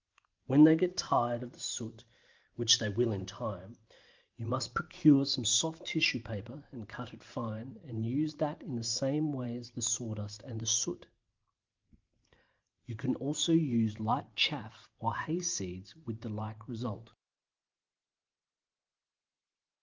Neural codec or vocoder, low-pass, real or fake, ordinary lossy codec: vocoder, 44.1 kHz, 128 mel bands every 512 samples, BigVGAN v2; 7.2 kHz; fake; Opus, 32 kbps